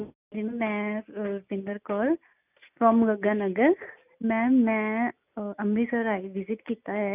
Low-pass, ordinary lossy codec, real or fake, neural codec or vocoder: 3.6 kHz; none; real; none